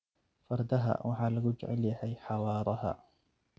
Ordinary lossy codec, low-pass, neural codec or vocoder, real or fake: none; none; none; real